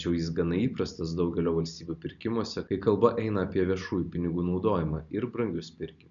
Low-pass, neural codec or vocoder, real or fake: 7.2 kHz; none; real